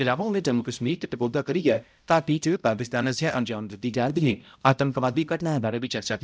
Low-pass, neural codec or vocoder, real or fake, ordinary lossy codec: none; codec, 16 kHz, 0.5 kbps, X-Codec, HuBERT features, trained on balanced general audio; fake; none